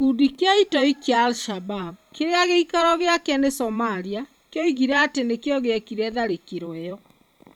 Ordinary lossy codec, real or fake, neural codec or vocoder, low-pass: none; fake; vocoder, 44.1 kHz, 128 mel bands every 512 samples, BigVGAN v2; 19.8 kHz